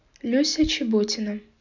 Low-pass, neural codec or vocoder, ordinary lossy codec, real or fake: 7.2 kHz; none; none; real